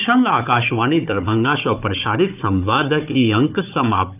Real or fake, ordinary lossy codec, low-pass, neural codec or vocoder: fake; none; 3.6 kHz; codec, 16 kHz, 4 kbps, FunCodec, trained on Chinese and English, 50 frames a second